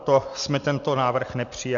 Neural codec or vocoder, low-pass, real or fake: none; 7.2 kHz; real